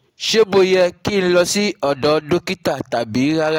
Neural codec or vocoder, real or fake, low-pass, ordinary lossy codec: none; real; 19.8 kHz; AAC, 48 kbps